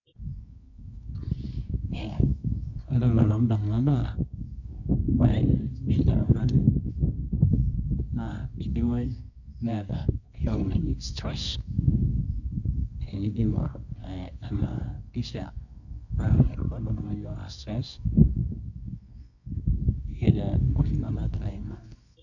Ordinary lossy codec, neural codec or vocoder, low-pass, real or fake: none; codec, 24 kHz, 0.9 kbps, WavTokenizer, medium music audio release; 7.2 kHz; fake